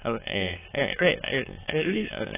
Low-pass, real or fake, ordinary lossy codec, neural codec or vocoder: 3.6 kHz; fake; AAC, 16 kbps; autoencoder, 22.05 kHz, a latent of 192 numbers a frame, VITS, trained on many speakers